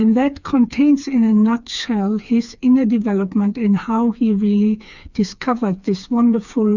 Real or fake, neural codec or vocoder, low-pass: fake; codec, 16 kHz, 4 kbps, FreqCodec, smaller model; 7.2 kHz